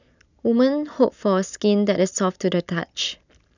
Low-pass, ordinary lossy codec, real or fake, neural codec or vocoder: 7.2 kHz; none; real; none